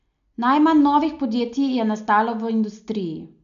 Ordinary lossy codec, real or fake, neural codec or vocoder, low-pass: AAC, 96 kbps; real; none; 7.2 kHz